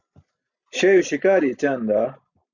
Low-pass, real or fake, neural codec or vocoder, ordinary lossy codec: 7.2 kHz; real; none; Opus, 64 kbps